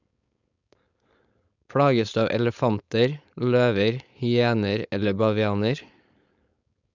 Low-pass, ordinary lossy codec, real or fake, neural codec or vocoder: 7.2 kHz; none; fake; codec, 16 kHz, 4.8 kbps, FACodec